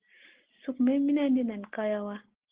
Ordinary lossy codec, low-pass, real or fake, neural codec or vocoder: Opus, 16 kbps; 3.6 kHz; real; none